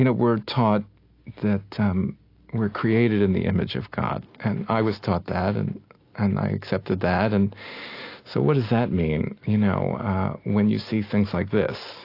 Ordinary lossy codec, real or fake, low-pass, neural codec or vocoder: AAC, 32 kbps; real; 5.4 kHz; none